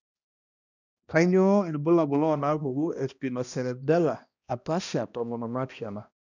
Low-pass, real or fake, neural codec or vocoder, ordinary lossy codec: 7.2 kHz; fake; codec, 16 kHz, 1 kbps, X-Codec, HuBERT features, trained on balanced general audio; none